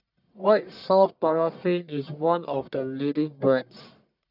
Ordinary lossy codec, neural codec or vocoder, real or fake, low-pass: none; codec, 44.1 kHz, 1.7 kbps, Pupu-Codec; fake; 5.4 kHz